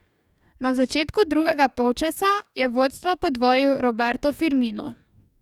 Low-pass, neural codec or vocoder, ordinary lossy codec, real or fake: 19.8 kHz; codec, 44.1 kHz, 2.6 kbps, DAC; none; fake